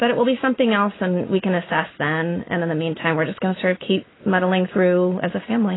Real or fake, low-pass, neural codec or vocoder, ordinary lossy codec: real; 7.2 kHz; none; AAC, 16 kbps